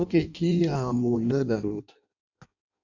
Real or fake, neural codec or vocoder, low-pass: fake; codec, 16 kHz in and 24 kHz out, 0.6 kbps, FireRedTTS-2 codec; 7.2 kHz